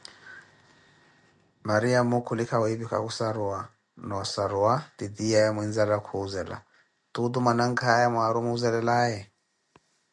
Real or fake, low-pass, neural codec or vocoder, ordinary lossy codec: real; 10.8 kHz; none; MP3, 96 kbps